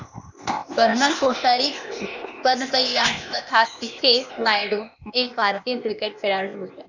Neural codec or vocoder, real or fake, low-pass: codec, 16 kHz, 0.8 kbps, ZipCodec; fake; 7.2 kHz